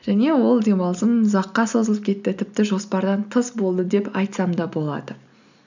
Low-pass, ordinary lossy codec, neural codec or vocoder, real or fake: 7.2 kHz; none; none; real